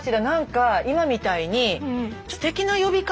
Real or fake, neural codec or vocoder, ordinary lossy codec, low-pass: real; none; none; none